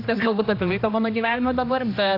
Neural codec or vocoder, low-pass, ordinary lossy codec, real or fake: codec, 16 kHz, 1 kbps, X-Codec, HuBERT features, trained on general audio; 5.4 kHz; AAC, 32 kbps; fake